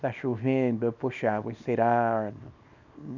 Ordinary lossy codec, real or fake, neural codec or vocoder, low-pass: none; fake; codec, 24 kHz, 0.9 kbps, WavTokenizer, small release; 7.2 kHz